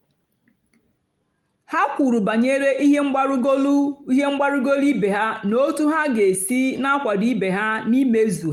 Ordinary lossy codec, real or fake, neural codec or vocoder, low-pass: Opus, 32 kbps; real; none; 19.8 kHz